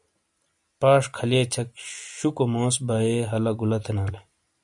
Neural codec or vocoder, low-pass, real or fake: none; 10.8 kHz; real